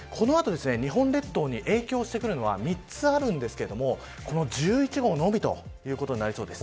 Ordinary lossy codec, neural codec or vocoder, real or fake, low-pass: none; none; real; none